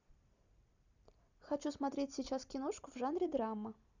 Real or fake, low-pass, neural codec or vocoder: real; 7.2 kHz; none